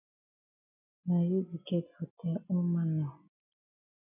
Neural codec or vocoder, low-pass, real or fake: none; 3.6 kHz; real